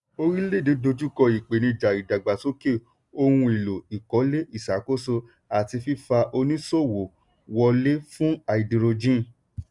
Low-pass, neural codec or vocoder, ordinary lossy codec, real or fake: 10.8 kHz; none; none; real